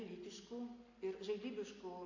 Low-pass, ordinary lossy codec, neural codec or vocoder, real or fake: 7.2 kHz; AAC, 48 kbps; none; real